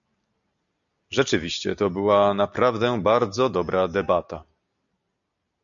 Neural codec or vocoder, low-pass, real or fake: none; 7.2 kHz; real